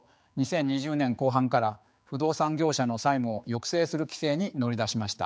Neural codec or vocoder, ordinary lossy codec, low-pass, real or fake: codec, 16 kHz, 4 kbps, X-Codec, HuBERT features, trained on general audio; none; none; fake